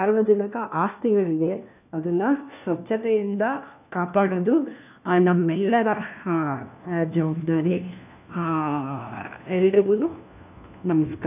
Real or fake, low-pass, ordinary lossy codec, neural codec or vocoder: fake; 3.6 kHz; none; codec, 16 kHz, 1 kbps, FunCodec, trained on LibriTTS, 50 frames a second